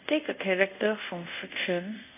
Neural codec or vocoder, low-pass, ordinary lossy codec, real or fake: codec, 24 kHz, 0.5 kbps, DualCodec; 3.6 kHz; none; fake